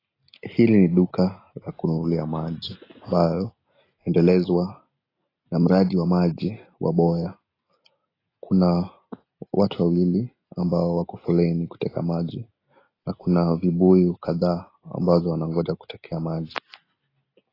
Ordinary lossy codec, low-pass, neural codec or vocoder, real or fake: AAC, 24 kbps; 5.4 kHz; none; real